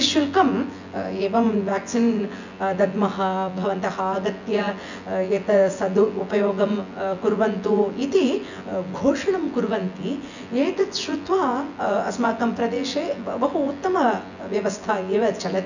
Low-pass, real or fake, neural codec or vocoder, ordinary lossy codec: 7.2 kHz; fake; vocoder, 24 kHz, 100 mel bands, Vocos; none